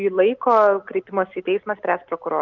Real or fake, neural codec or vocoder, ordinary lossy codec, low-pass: real; none; Opus, 24 kbps; 7.2 kHz